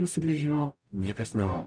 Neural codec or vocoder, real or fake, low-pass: codec, 44.1 kHz, 0.9 kbps, DAC; fake; 9.9 kHz